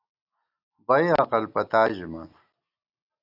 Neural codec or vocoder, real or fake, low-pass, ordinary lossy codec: none; real; 5.4 kHz; AAC, 48 kbps